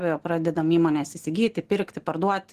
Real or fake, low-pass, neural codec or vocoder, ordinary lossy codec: real; 14.4 kHz; none; Opus, 16 kbps